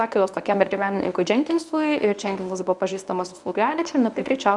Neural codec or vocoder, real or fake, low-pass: codec, 24 kHz, 0.9 kbps, WavTokenizer, medium speech release version 1; fake; 10.8 kHz